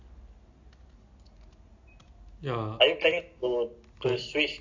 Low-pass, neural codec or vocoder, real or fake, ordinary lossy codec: 7.2 kHz; none; real; AAC, 48 kbps